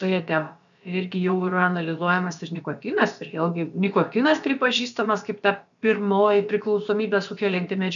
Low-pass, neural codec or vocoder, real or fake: 7.2 kHz; codec, 16 kHz, about 1 kbps, DyCAST, with the encoder's durations; fake